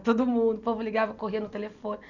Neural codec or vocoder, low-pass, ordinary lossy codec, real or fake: none; 7.2 kHz; none; real